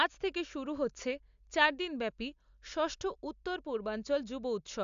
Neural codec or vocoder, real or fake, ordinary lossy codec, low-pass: none; real; AAC, 96 kbps; 7.2 kHz